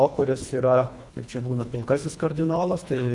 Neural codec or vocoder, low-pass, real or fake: codec, 24 kHz, 1.5 kbps, HILCodec; 10.8 kHz; fake